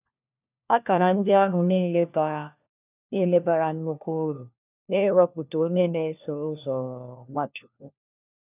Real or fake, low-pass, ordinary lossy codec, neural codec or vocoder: fake; 3.6 kHz; none; codec, 16 kHz, 1 kbps, FunCodec, trained on LibriTTS, 50 frames a second